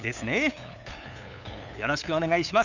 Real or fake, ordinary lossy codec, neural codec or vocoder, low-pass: fake; none; codec, 16 kHz, 8 kbps, FunCodec, trained on LibriTTS, 25 frames a second; 7.2 kHz